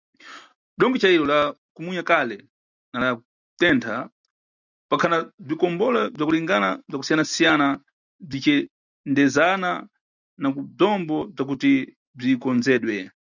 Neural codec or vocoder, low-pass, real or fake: none; 7.2 kHz; real